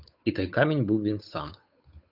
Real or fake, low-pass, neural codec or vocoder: fake; 5.4 kHz; codec, 16 kHz, 8 kbps, FunCodec, trained on Chinese and English, 25 frames a second